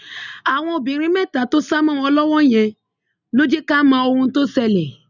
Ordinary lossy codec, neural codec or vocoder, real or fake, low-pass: none; none; real; 7.2 kHz